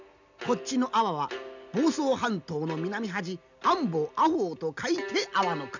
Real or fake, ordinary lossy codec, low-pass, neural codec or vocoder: real; none; 7.2 kHz; none